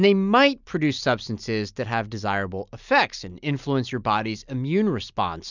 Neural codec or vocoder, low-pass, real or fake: none; 7.2 kHz; real